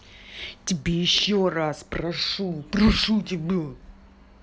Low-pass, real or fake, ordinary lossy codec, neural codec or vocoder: none; real; none; none